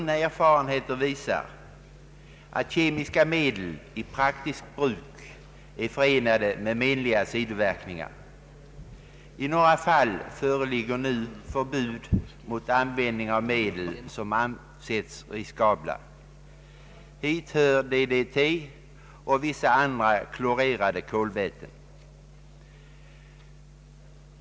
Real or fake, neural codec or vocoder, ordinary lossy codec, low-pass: real; none; none; none